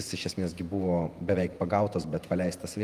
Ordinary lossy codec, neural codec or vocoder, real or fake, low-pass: Opus, 32 kbps; vocoder, 48 kHz, 128 mel bands, Vocos; fake; 14.4 kHz